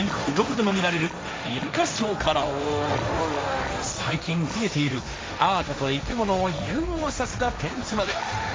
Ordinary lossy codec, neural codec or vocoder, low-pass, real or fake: none; codec, 16 kHz, 1.1 kbps, Voila-Tokenizer; none; fake